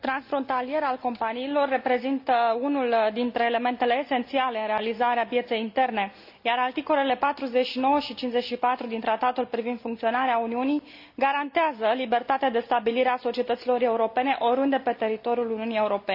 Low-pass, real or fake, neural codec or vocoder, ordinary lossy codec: 5.4 kHz; real; none; none